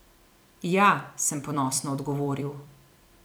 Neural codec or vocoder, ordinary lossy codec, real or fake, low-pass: vocoder, 44.1 kHz, 128 mel bands every 256 samples, BigVGAN v2; none; fake; none